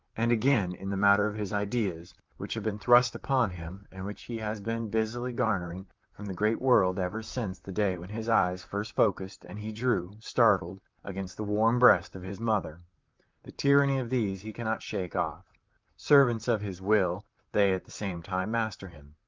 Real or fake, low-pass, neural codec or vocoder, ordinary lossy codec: fake; 7.2 kHz; vocoder, 44.1 kHz, 128 mel bands, Pupu-Vocoder; Opus, 32 kbps